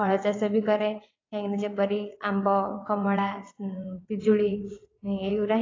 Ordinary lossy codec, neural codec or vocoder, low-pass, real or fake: AAC, 32 kbps; vocoder, 22.05 kHz, 80 mel bands, WaveNeXt; 7.2 kHz; fake